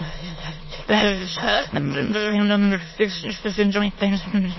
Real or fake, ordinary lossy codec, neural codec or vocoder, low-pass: fake; MP3, 24 kbps; autoencoder, 22.05 kHz, a latent of 192 numbers a frame, VITS, trained on many speakers; 7.2 kHz